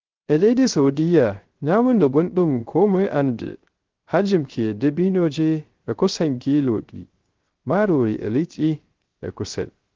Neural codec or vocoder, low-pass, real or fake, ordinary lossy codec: codec, 16 kHz, 0.3 kbps, FocalCodec; 7.2 kHz; fake; Opus, 16 kbps